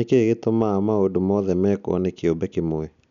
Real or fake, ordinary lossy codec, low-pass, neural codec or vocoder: real; MP3, 96 kbps; 7.2 kHz; none